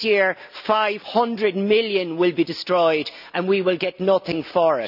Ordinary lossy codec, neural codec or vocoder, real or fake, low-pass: none; none; real; 5.4 kHz